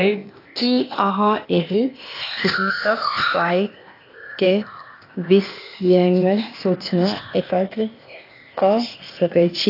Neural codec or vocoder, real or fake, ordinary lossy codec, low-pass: codec, 16 kHz, 0.8 kbps, ZipCodec; fake; AAC, 32 kbps; 5.4 kHz